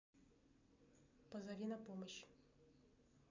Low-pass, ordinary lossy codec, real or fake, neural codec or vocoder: 7.2 kHz; MP3, 64 kbps; real; none